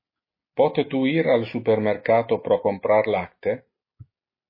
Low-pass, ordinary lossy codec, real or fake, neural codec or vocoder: 5.4 kHz; MP3, 24 kbps; real; none